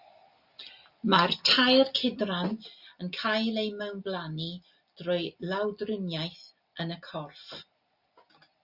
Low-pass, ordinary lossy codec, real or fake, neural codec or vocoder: 5.4 kHz; Opus, 64 kbps; real; none